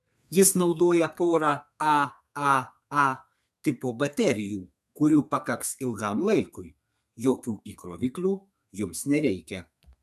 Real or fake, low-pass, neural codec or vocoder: fake; 14.4 kHz; codec, 32 kHz, 1.9 kbps, SNAC